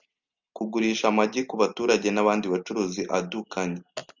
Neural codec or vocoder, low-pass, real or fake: none; 7.2 kHz; real